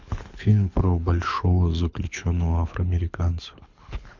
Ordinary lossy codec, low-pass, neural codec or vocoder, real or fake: MP3, 64 kbps; 7.2 kHz; codec, 24 kHz, 6 kbps, HILCodec; fake